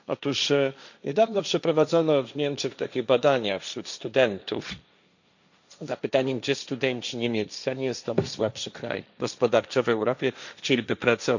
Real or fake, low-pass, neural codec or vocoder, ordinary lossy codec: fake; 7.2 kHz; codec, 16 kHz, 1.1 kbps, Voila-Tokenizer; none